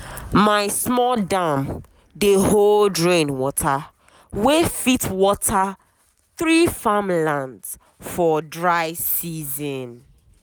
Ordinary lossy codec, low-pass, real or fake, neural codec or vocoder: none; none; real; none